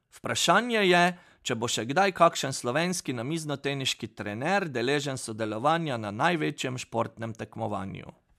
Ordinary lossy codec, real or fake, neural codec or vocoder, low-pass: MP3, 96 kbps; real; none; 14.4 kHz